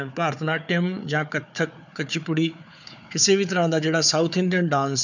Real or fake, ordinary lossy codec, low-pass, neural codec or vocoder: fake; none; 7.2 kHz; codec, 16 kHz, 4 kbps, FunCodec, trained on Chinese and English, 50 frames a second